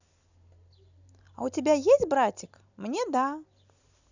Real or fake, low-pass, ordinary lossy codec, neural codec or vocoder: real; 7.2 kHz; none; none